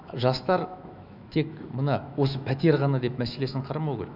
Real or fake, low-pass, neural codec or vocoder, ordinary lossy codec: fake; 5.4 kHz; autoencoder, 48 kHz, 128 numbers a frame, DAC-VAE, trained on Japanese speech; none